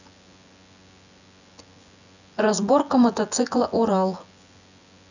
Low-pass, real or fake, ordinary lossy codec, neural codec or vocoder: 7.2 kHz; fake; none; vocoder, 24 kHz, 100 mel bands, Vocos